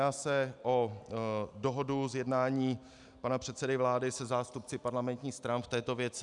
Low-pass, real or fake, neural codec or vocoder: 10.8 kHz; real; none